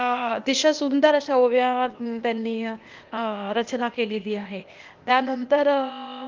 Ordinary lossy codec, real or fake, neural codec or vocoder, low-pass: Opus, 32 kbps; fake; codec, 16 kHz, 0.8 kbps, ZipCodec; 7.2 kHz